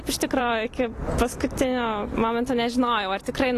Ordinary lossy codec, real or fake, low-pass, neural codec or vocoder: AAC, 48 kbps; fake; 14.4 kHz; vocoder, 44.1 kHz, 128 mel bands every 256 samples, BigVGAN v2